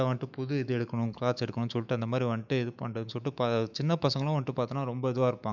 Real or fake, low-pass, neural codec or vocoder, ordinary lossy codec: fake; 7.2 kHz; autoencoder, 48 kHz, 128 numbers a frame, DAC-VAE, trained on Japanese speech; none